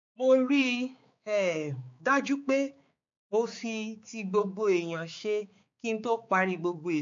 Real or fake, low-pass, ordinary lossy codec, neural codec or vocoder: fake; 7.2 kHz; MP3, 48 kbps; codec, 16 kHz, 4 kbps, X-Codec, HuBERT features, trained on balanced general audio